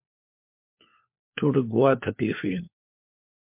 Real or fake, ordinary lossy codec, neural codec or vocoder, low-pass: fake; MP3, 32 kbps; codec, 16 kHz, 4 kbps, FunCodec, trained on LibriTTS, 50 frames a second; 3.6 kHz